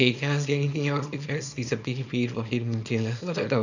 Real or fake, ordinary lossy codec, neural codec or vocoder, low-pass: fake; none; codec, 24 kHz, 0.9 kbps, WavTokenizer, small release; 7.2 kHz